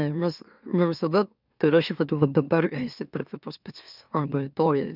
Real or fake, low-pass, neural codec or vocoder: fake; 5.4 kHz; autoencoder, 44.1 kHz, a latent of 192 numbers a frame, MeloTTS